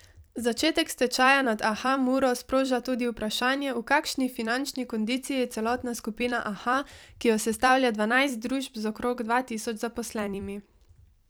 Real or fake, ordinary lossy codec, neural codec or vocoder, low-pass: fake; none; vocoder, 44.1 kHz, 128 mel bands every 256 samples, BigVGAN v2; none